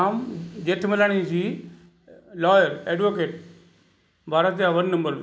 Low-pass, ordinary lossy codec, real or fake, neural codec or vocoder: none; none; real; none